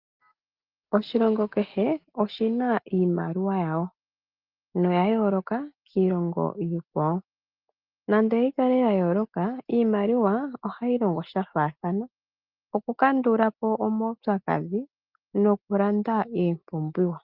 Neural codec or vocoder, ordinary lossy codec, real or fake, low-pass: none; Opus, 32 kbps; real; 5.4 kHz